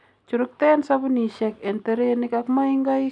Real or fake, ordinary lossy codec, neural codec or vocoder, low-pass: real; none; none; 9.9 kHz